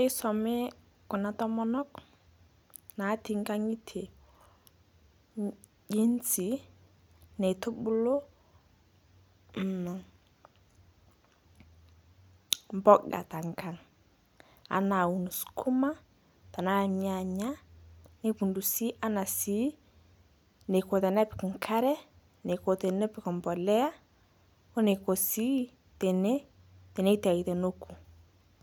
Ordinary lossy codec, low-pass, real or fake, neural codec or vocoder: none; none; real; none